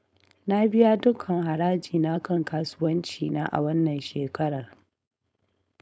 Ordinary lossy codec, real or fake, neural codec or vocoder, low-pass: none; fake; codec, 16 kHz, 4.8 kbps, FACodec; none